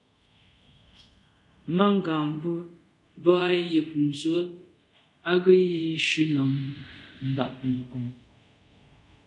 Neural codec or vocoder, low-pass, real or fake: codec, 24 kHz, 0.5 kbps, DualCodec; 10.8 kHz; fake